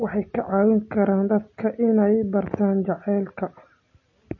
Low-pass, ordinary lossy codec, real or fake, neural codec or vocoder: 7.2 kHz; MP3, 32 kbps; real; none